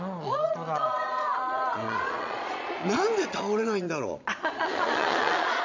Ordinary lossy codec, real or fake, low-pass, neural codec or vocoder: MP3, 64 kbps; fake; 7.2 kHz; vocoder, 22.05 kHz, 80 mel bands, Vocos